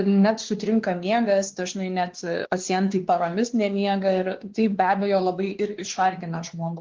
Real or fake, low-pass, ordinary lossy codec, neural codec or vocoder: fake; 7.2 kHz; Opus, 16 kbps; codec, 16 kHz, 2 kbps, X-Codec, WavLM features, trained on Multilingual LibriSpeech